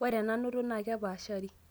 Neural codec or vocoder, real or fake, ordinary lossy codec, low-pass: none; real; none; none